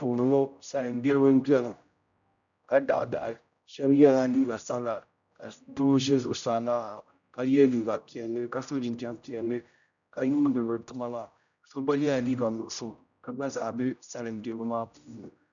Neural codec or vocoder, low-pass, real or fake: codec, 16 kHz, 0.5 kbps, X-Codec, HuBERT features, trained on general audio; 7.2 kHz; fake